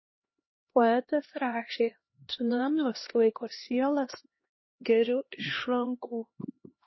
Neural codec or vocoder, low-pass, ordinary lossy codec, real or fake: codec, 16 kHz, 1 kbps, X-Codec, HuBERT features, trained on LibriSpeech; 7.2 kHz; MP3, 24 kbps; fake